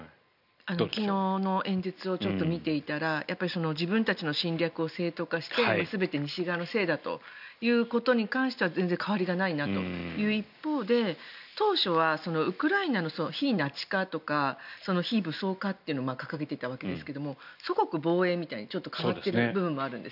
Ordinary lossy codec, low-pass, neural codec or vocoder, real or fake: none; 5.4 kHz; none; real